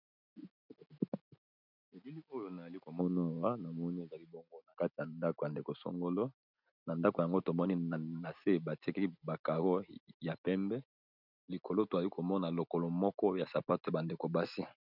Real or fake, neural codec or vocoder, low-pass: real; none; 5.4 kHz